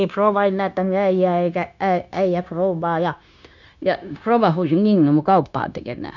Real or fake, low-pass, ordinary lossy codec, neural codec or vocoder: fake; 7.2 kHz; none; codec, 16 kHz, 0.9 kbps, LongCat-Audio-Codec